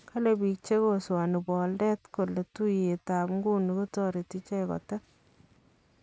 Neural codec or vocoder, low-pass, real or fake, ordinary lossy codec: none; none; real; none